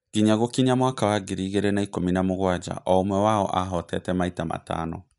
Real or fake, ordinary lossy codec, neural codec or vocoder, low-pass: real; none; none; 10.8 kHz